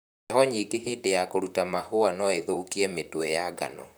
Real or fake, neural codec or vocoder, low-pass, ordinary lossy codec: fake; vocoder, 44.1 kHz, 128 mel bands, Pupu-Vocoder; none; none